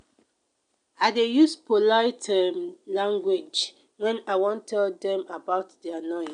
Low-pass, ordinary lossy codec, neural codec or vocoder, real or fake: 9.9 kHz; none; none; real